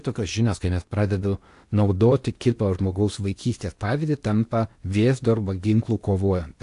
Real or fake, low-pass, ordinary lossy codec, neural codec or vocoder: fake; 10.8 kHz; AAC, 64 kbps; codec, 16 kHz in and 24 kHz out, 0.8 kbps, FocalCodec, streaming, 65536 codes